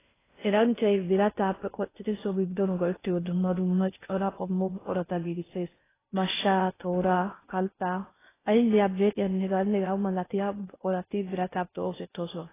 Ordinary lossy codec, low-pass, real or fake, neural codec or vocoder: AAC, 16 kbps; 3.6 kHz; fake; codec, 16 kHz in and 24 kHz out, 0.6 kbps, FocalCodec, streaming, 4096 codes